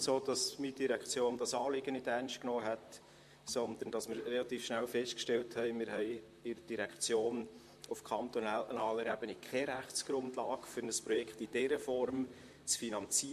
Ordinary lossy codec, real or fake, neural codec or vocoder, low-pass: MP3, 64 kbps; fake; vocoder, 44.1 kHz, 128 mel bands, Pupu-Vocoder; 14.4 kHz